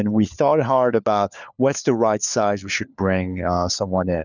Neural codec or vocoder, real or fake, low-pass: codec, 16 kHz, 4 kbps, FunCodec, trained on LibriTTS, 50 frames a second; fake; 7.2 kHz